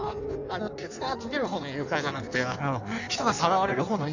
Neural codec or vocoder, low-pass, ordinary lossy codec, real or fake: codec, 16 kHz in and 24 kHz out, 1.1 kbps, FireRedTTS-2 codec; 7.2 kHz; none; fake